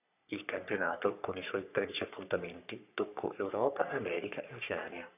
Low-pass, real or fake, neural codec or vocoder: 3.6 kHz; fake; codec, 44.1 kHz, 3.4 kbps, Pupu-Codec